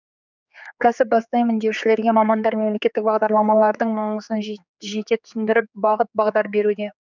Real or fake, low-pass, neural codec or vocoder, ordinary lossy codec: fake; 7.2 kHz; codec, 16 kHz, 4 kbps, X-Codec, HuBERT features, trained on general audio; none